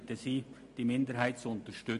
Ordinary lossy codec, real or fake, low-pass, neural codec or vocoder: MP3, 48 kbps; real; 14.4 kHz; none